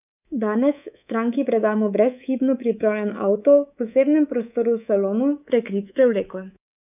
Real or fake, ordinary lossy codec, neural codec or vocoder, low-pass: fake; none; codec, 24 kHz, 3.1 kbps, DualCodec; 3.6 kHz